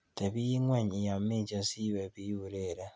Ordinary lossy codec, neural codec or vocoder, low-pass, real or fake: none; none; none; real